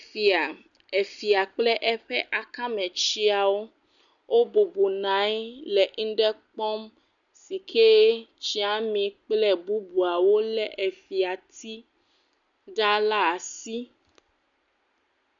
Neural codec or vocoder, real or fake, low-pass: none; real; 7.2 kHz